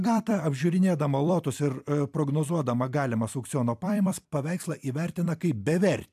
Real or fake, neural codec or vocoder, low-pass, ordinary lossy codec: fake; vocoder, 44.1 kHz, 128 mel bands every 256 samples, BigVGAN v2; 14.4 kHz; AAC, 96 kbps